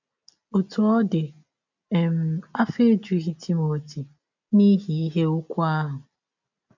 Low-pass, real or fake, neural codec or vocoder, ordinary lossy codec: 7.2 kHz; real; none; none